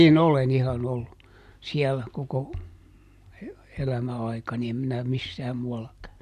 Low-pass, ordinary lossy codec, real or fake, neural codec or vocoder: 14.4 kHz; none; real; none